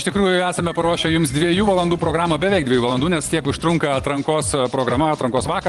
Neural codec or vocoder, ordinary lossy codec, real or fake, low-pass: vocoder, 24 kHz, 100 mel bands, Vocos; Opus, 24 kbps; fake; 10.8 kHz